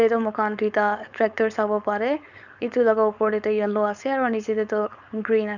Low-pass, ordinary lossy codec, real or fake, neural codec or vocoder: 7.2 kHz; none; fake; codec, 16 kHz, 4.8 kbps, FACodec